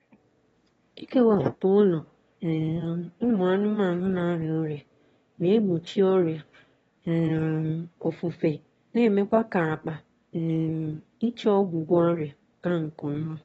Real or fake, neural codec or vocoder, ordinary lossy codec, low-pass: fake; autoencoder, 22.05 kHz, a latent of 192 numbers a frame, VITS, trained on one speaker; AAC, 24 kbps; 9.9 kHz